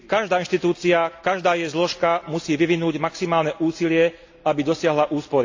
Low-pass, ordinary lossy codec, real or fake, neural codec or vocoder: 7.2 kHz; AAC, 48 kbps; real; none